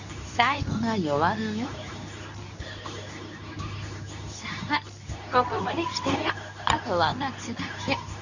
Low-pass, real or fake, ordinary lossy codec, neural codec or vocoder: 7.2 kHz; fake; none; codec, 24 kHz, 0.9 kbps, WavTokenizer, medium speech release version 2